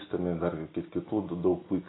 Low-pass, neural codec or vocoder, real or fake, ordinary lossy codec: 7.2 kHz; none; real; AAC, 16 kbps